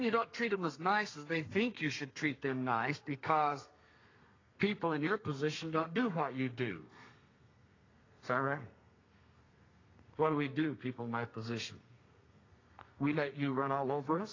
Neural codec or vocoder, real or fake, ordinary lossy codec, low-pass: codec, 32 kHz, 1.9 kbps, SNAC; fake; AAC, 32 kbps; 7.2 kHz